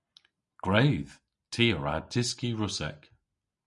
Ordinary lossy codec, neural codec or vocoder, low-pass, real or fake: MP3, 96 kbps; none; 10.8 kHz; real